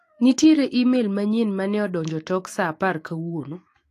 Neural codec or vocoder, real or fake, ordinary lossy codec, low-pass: autoencoder, 48 kHz, 128 numbers a frame, DAC-VAE, trained on Japanese speech; fake; AAC, 48 kbps; 14.4 kHz